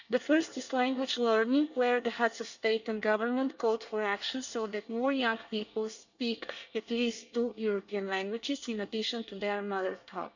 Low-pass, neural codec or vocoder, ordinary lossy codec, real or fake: 7.2 kHz; codec, 24 kHz, 1 kbps, SNAC; none; fake